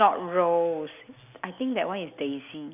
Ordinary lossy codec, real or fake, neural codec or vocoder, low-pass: none; real; none; 3.6 kHz